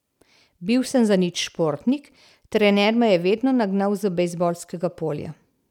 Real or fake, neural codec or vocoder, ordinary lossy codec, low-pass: real; none; none; 19.8 kHz